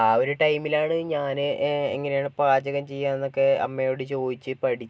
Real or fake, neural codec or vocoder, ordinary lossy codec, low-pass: real; none; none; none